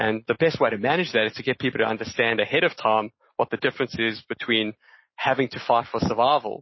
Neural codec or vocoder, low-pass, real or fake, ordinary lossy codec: none; 7.2 kHz; real; MP3, 24 kbps